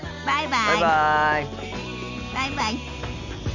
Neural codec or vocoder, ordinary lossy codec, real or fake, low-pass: none; none; real; 7.2 kHz